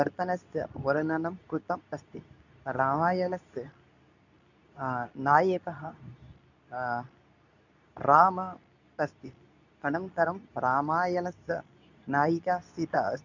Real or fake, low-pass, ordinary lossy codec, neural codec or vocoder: fake; 7.2 kHz; none; codec, 24 kHz, 0.9 kbps, WavTokenizer, medium speech release version 2